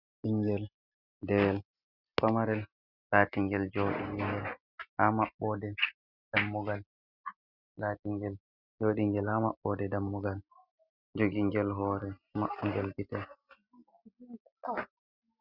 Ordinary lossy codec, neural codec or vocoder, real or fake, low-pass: Opus, 64 kbps; none; real; 5.4 kHz